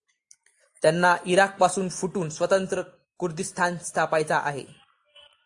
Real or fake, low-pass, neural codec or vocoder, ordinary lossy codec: real; 10.8 kHz; none; AAC, 64 kbps